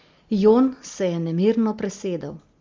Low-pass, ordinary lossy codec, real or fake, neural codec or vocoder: 7.2 kHz; Opus, 32 kbps; real; none